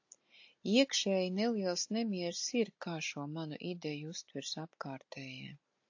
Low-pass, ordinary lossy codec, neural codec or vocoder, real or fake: 7.2 kHz; AAC, 48 kbps; none; real